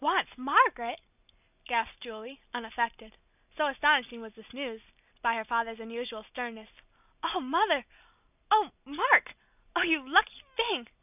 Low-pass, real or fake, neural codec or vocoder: 3.6 kHz; real; none